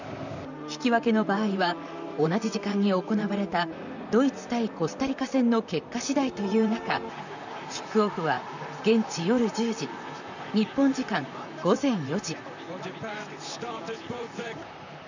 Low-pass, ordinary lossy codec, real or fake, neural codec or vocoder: 7.2 kHz; none; fake; vocoder, 44.1 kHz, 128 mel bands, Pupu-Vocoder